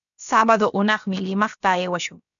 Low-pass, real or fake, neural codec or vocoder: 7.2 kHz; fake; codec, 16 kHz, about 1 kbps, DyCAST, with the encoder's durations